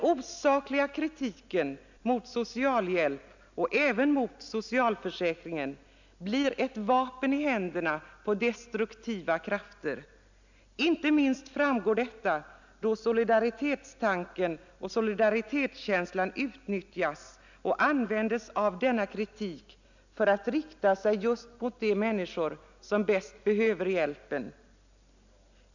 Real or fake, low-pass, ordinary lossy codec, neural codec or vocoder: real; 7.2 kHz; none; none